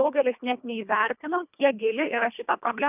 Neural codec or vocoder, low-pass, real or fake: codec, 24 kHz, 1.5 kbps, HILCodec; 3.6 kHz; fake